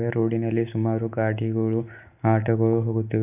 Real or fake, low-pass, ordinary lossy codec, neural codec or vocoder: real; 3.6 kHz; none; none